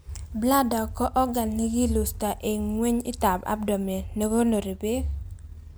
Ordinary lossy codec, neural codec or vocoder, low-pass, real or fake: none; none; none; real